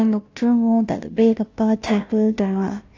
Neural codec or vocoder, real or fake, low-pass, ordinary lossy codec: codec, 16 kHz, 0.5 kbps, FunCodec, trained on Chinese and English, 25 frames a second; fake; 7.2 kHz; AAC, 48 kbps